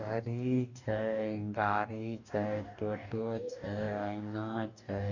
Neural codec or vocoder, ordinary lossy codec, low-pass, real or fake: codec, 44.1 kHz, 2.6 kbps, DAC; none; 7.2 kHz; fake